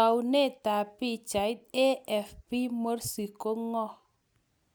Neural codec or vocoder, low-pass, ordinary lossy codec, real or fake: none; none; none; real